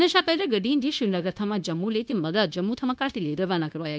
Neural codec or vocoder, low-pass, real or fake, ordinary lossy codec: codec, 16 kHz, 0.9 kbps, LongCat-Audio-Codec; none; fake; none